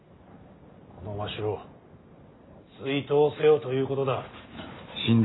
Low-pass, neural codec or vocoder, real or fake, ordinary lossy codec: 7.2 kHz; none; real; AAC, 16 kbps